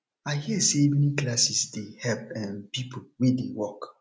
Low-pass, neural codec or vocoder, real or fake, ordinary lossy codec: none; none; real; none